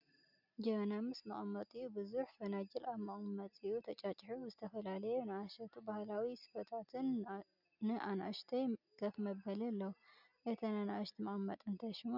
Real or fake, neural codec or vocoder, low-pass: real; none; 5.4 kHz